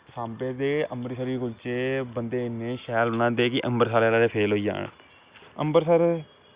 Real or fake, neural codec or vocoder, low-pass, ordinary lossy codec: real; none; 3.6 kHz; Opus, 64 kbps